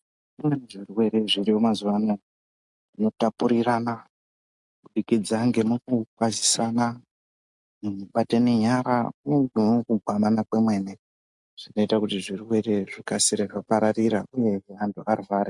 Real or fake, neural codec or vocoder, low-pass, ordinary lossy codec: real; none; 10.8 kHz; MP3, 64 kbps